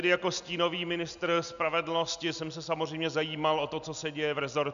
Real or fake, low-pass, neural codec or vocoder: real; 7.2 kHz; none